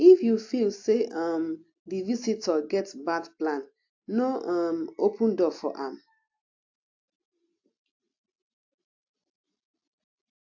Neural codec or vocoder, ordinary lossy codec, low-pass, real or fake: none; AAC, 48 kbps; 7.2 kHz; real